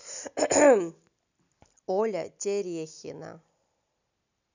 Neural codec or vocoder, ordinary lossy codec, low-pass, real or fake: none; none; 7.2 kHz; real